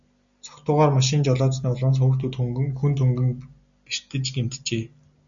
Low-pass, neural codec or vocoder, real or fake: 7.2 kHz; none; real